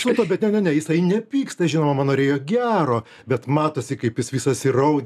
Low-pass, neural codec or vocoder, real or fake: 14.4 kHz; none; real